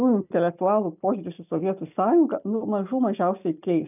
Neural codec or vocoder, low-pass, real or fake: none; 3.6 kHz; real